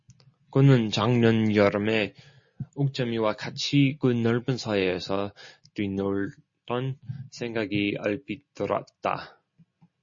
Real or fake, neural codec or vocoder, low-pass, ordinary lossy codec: real; none; 7.2 kHz; MP3, 32 kbps